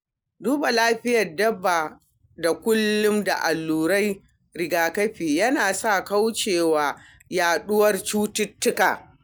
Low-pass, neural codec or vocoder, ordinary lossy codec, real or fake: none; none; none; real